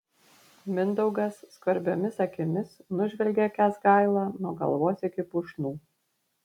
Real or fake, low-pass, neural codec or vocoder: real; 19.8 kHz; none